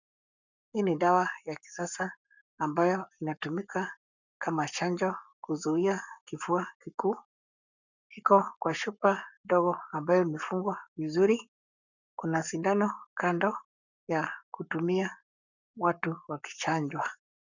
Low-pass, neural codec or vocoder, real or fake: 7.2 kHz; codec, 44.1 kHz, 7.8 kbps, Pupu-Codec; fake